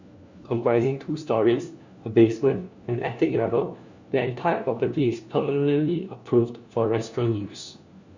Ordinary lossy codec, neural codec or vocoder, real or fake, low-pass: Opus, 64 kbps; codec, 16 kHz, 1 kbps, FunCodec, trained on LibriTTS, 50 frames a second; fake; 7.2 kHz